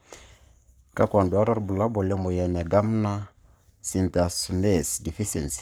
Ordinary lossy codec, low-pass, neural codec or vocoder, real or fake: none; none; codec, 44.1 kHz, 7.8 kbps, Pupu-Codec; fake